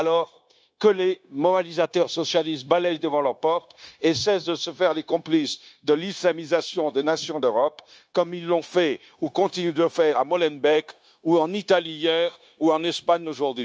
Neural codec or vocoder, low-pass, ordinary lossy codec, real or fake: codec, 16 kHz, 0.9 kbps, LongCat-Audio-Codec; none; none; fake